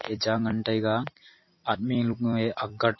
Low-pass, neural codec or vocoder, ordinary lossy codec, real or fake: 7.2 kHz; vocoder, 44.1 kHz, 128 mel bands every 256 samples, BigVGAN v2; MP3, 24 kbps; fake